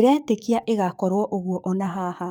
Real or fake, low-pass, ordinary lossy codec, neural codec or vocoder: fake; none; none; codec, 44.1 kHz, 7.8 kbps, DAC